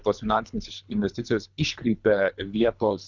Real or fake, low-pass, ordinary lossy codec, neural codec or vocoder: fake; 7.2 kHz; Opus, 64 kbps; codec, 24 kHz, 3 kbps, HILCodec